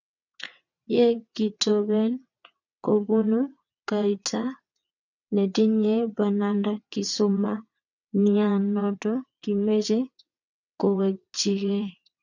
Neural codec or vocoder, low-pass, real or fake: vocoder, 22.05 kHz, 80 mel bands, WaveNeXt; 7.2 kHz; fake